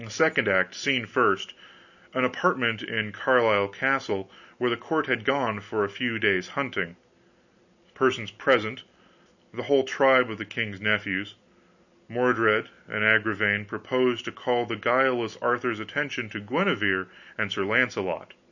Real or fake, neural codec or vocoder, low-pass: real; none; 7.2 kHz